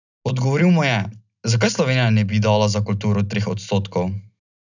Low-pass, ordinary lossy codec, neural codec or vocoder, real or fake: 7.2 kHz; none; none; real